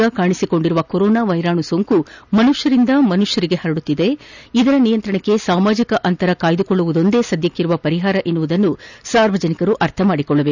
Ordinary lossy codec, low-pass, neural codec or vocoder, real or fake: none; 7.2 kHz; none; real